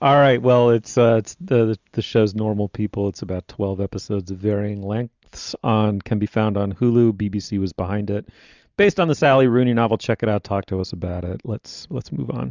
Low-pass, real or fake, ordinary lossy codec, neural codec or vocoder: 7.2 kHz; real; Opus, 64 kbps; none